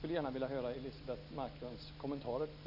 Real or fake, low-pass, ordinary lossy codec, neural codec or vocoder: real; 5.4 kHz; none; none